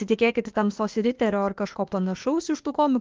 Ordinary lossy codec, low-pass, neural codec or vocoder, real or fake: Opus, 32 kbps; 7.2 kHz; codec, 16 kHz, 0.8 kbps, ZipCodec; fake